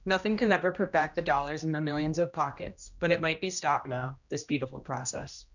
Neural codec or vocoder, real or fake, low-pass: codec, 16 kHz, 1 kbps, X-Codec, HuBERT features, trained on general audio; fake; 7.2 kHz